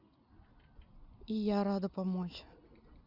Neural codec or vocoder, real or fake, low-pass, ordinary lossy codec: none; real; 5.4 kHz; Opus, 64 kbps